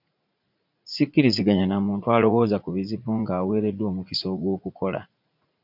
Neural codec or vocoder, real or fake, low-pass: vocoder, 44.1 kHz, 80 mel bands, Vocos; fake; 5.4 kHz